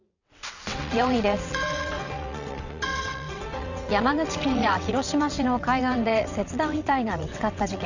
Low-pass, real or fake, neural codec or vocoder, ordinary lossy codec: 7.2 kHz; fake; vocoder, 22.05 kHz, 80 mel bands, WaveNeXt; none